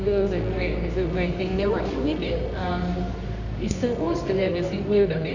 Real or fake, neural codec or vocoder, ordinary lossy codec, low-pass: fake; codec, 24 kHz, 0.9 kbps, WavTokenizer, medium music audio release; none; 7.2 kHz